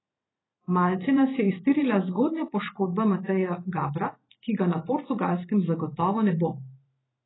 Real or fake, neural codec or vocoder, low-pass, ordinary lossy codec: fake; vocoder, 44.1 kHz, 128 mel bands every 512 samples, BigVGAN v2; 7.2 kHz; AAC, 16 kbps